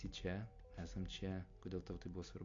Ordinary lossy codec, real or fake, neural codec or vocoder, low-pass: AAC, 48 kbps; real; none; 7.2 kHz